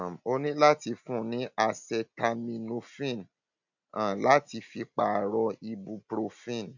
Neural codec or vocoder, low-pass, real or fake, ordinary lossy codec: none; 7.2 kHz; real; none